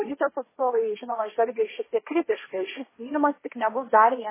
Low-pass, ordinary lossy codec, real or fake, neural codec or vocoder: 3.6 kHz; MP3, 16 kbps; fake; codec, 16 kHz, 1.1 kbps, Voila-Tokenizer